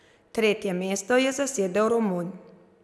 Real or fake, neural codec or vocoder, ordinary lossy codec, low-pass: real; none; none; none